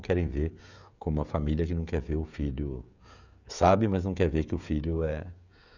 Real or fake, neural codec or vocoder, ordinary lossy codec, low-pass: fake; codec, 16 kHz, 16 kbps, FreqCodec, smaller model; none; 7.2 kHz